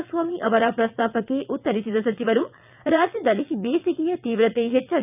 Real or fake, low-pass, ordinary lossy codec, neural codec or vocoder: fake; 3.6 kHz; none; vocoder, 22.05 kHz, 80 mel bands, WaveNeXt